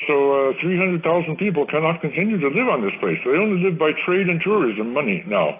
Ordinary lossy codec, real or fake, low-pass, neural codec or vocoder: AAC, 24 kbps; real; 3.6 kHz; none